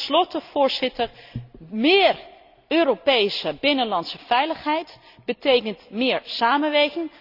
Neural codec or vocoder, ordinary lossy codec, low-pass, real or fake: none; none; 5.4 kHz; real